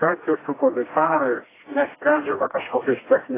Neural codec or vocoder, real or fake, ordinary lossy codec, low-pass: codec, 16 kHz, 1 kbps, FreqCodec, smaller model; fake; AAC, 16 kbps; 3.6 kHz